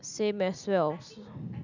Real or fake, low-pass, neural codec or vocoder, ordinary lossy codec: real; 7.2 kHz; none; none